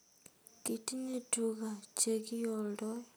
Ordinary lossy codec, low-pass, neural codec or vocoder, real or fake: none; none; none; real